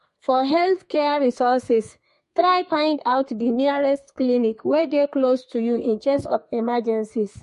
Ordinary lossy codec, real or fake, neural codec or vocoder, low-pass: MP3, 48 kbps; fake; codec, 32 kHz, 1.9 kbps, SNAC; 14.4 kHz